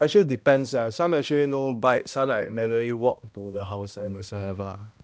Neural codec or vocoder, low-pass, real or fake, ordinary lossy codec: codec, 16 kHz, 1 kbps, X-Codec, HuBERT features, trained on balanced general audio; none; fake; none